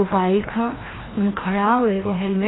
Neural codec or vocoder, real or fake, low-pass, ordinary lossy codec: codec, 16 kHz, 1 kbps, FreqCodec, larger model; fake; 7.2 kHz; AAC, 16 kbps